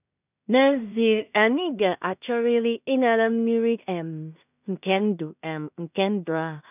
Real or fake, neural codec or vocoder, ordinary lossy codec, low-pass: fake; codec, 16 kHz in and 24 kHz out, 0.4 kbps, LongCat-Audio-Codec, two codebook decoder; none; 3.6 kHz